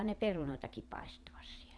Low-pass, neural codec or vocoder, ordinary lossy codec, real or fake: none; vocoder, 22.05 kHz, 80 mel bands, Vocos; none; fake